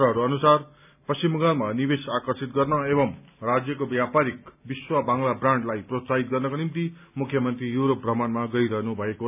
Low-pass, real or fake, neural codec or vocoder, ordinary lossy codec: 3.6 kHz; real; none; none